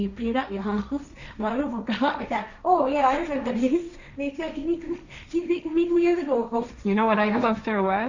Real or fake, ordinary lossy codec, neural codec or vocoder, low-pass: fake; none; codec, 16 kHz, 1.1 kbps, Voila-Tokenizer; 7.2 kHz